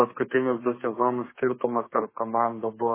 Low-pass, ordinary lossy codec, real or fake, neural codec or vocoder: 3.6 kHz; MP3, 16 kbps; fake; codec, 32 kHz, 1.9 kbps, SNAC